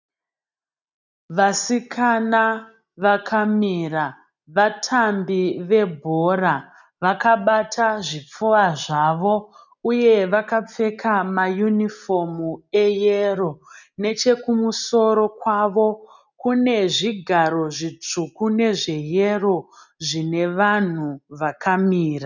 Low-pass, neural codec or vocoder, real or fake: 7.2 kHz; none; real